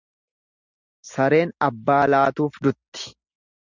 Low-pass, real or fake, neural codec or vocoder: 7.2 kHz; real; none